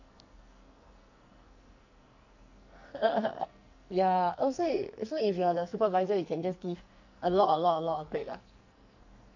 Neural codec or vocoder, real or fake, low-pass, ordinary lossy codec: codec, 44.1 kHz, 2.6 kbps, SNAC; fake; 7.2 kHz; none